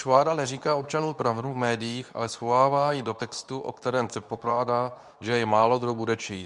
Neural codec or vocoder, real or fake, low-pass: codec, 24 kHz, 0.9 kbps, WavTokenizer, medium speech release version 2; fake; 10.8 kHz